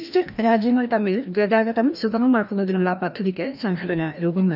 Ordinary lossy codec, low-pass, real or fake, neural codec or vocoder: none; 5.4 kHz; fake; codec, 16 kHz, 1 kbps, FreqCodec, larger model